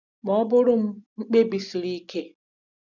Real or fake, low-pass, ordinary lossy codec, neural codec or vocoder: real; 7.2 kHz; none; none